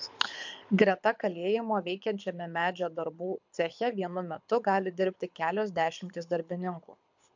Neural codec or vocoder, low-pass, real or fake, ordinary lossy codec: codec, 24 kHz, 6 kbps, HILCodec; 7.2 kHz; fake; MP3, 64 kbps